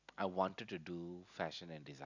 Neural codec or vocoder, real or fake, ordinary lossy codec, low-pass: none; real; none; 7.2 kHz